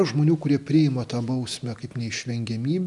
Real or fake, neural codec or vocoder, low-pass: real; none; 10.8 kHz